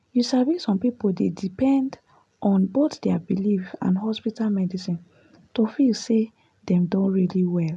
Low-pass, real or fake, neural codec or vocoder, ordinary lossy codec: none; real; none; none